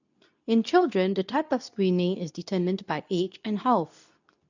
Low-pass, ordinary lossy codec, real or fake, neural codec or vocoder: 7.2 kHz; none; fake; codec, 24 kHz, 0.9 kbps, WavTokenizer, medium speech release version 2